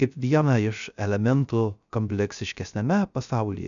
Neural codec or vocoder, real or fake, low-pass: codec, 16 kHz, 0.3 kbps, FocalCodec; fake; 7.2 kHz